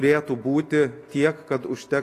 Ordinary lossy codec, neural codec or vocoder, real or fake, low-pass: AAC, 48 kbps; none; real; 14.4 kHz